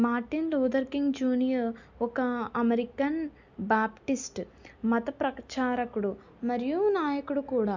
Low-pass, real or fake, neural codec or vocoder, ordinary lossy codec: 7.2 kHz; real; none; none